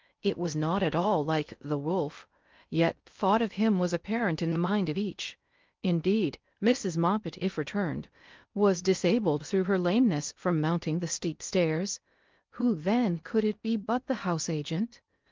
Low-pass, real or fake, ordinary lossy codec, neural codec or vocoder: 7.2 kHz; fake; Opus, 24 kbps; codec, 16 kHz in and 24 kHz out, 0.6 kbps, FocalCodec, streaming, 4096 codes